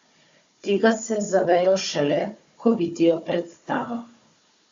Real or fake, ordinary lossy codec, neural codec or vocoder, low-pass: fake; Opus, 64 kbps; codec, 16 kHz, 4 kbps, FunCodec, trained on Chinese and English, 50 frames a second; 7.2 kHz